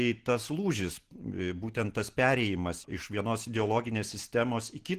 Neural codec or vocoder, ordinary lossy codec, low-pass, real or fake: none; Opus, 16 kbps; 14.4 kHz; real